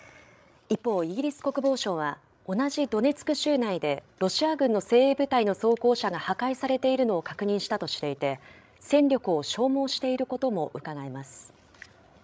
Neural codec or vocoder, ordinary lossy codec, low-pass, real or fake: codec, 16 kHz, 16 kbps, FreqCodec, larger model; none; none; fake